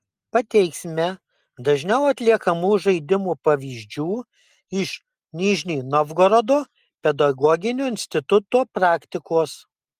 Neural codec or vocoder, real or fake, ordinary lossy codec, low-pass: none; real; Opus, 32 kbps; 14.4 kHz